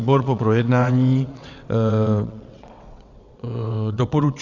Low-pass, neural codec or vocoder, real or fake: 7.2 kHz; vocoder, 22.05 kHz, 80 mel bands, WaveNeXt; fake